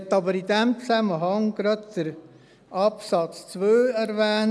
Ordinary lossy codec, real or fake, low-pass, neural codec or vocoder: none; real; none; none